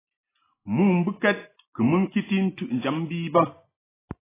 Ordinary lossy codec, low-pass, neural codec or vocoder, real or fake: AAC, 16 kbps; 3.6 kHz; none; real